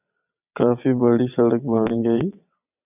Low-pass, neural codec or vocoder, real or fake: 3.6 kHz; vocoder, 22.05 kHz, 80 mel bands, WaveNeXt; fake